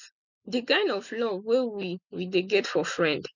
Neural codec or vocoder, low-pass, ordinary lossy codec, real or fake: none; 7.2 kHz; none; real